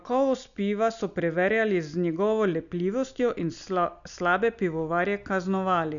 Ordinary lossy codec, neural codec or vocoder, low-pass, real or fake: none; none; 7.2 kHz; real